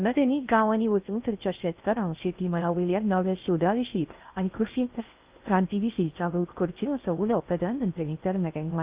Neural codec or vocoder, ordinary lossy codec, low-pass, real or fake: codec, 16 kHz in and 24 kHz out, 0.6 kbps, FocalCodec, streaming, 4096 codes; Opus, 24 kbps; 3.6 kHz; fake